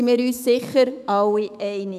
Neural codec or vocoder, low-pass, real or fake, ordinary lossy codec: autoencoder, 48 kHz, 128 numbers a frame, DAC-VAE, trained on Japanese speech; 14.4 kHz; fake; none